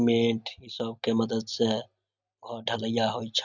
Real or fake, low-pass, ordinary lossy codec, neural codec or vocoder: real; 7.2 kHz; none; none